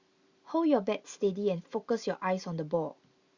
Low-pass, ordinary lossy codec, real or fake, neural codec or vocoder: 7.2 kHz; Opus, 64 kbps; real; none